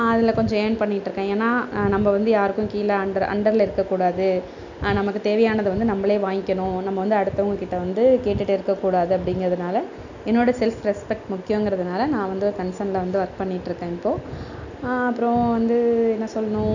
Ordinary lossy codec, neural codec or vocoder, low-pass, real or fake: none; none; 7.2 kHz; real